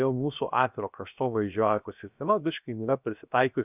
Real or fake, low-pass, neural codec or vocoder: fake; 3.6 kHz; codec, 16 kHz, 0.7 kbps, FocalCodec